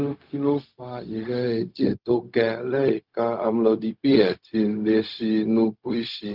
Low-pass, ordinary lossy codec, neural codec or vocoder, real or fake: 5.4 kHz; Opus, 24 kbps; codec, 16 kHz, 0.4 kbps, LongCat-Audio-Codec; fake